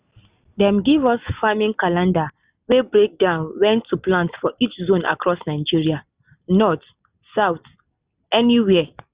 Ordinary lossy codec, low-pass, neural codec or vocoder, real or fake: Opus, 64 kbps; 3.6 kHz; none; real